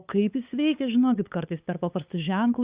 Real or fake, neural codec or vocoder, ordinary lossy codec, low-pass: fake; codec, 16 kHz, 8 kbps, FunCodec, trained on LibriTTS, 25 frames a second; Opus, 64 kbps; 3.6 kHz